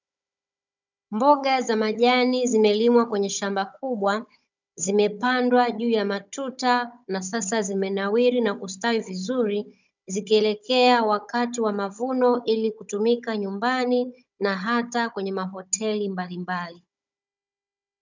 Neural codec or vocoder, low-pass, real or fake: codec, 16 kHz, 16 kbps, FunCodec, trained on Chinese and English, 50 frames a second; 7.2 kHz; fake